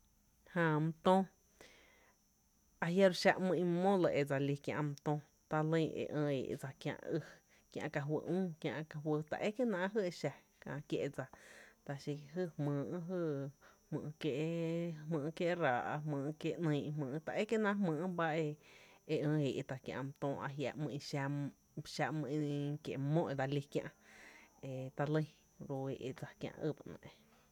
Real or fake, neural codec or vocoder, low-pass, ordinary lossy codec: real; none; 19.8 kHz; none